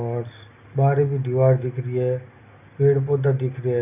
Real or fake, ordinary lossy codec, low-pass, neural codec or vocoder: real; none; 3.6 kHz; none